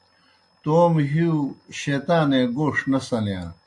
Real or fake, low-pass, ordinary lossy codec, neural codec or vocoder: real; 10.8 kHz; AAC, 64 kbps; none